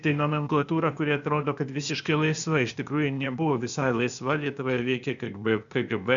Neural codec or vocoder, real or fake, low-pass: codec, 16 kHz, 0.8 kbps, ZipCodec; fake; 7.2 kHz